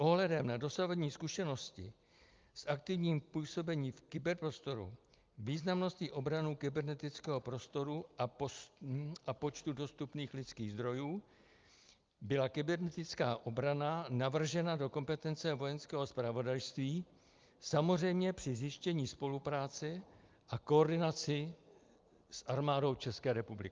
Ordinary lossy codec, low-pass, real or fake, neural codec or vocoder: Opus, 24 kbps; 7.2 kHz; real; none